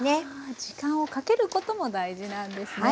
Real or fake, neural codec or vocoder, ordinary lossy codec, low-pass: real; none; none; none